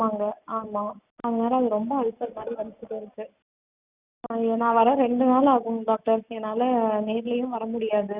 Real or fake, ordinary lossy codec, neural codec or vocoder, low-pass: real; Opus, 64 kbps; none; 3.6 kHz